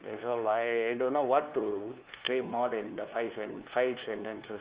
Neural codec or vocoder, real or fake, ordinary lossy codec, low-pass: codec, 16 kHz, 2 kbps, FunCodec, trained on LibriTTS, 25 frames a second; fake; Opus, 32 kbps; 3.6 kHz